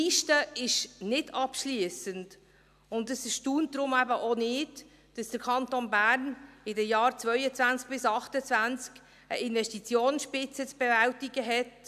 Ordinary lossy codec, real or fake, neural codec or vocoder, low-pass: none; real; none; 14.4 kHz